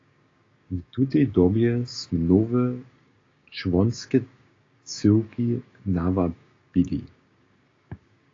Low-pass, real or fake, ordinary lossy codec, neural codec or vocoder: 7.2 kHz; fake; AAC, 32 kbps; codec, 16 kHz, 6 kbps, DAC